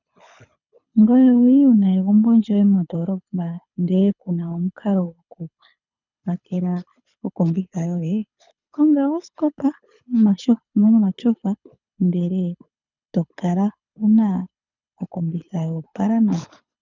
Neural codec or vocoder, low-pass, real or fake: codec, 24 kHz, 6 kbps, HILCodec; 7.2 kHz; fake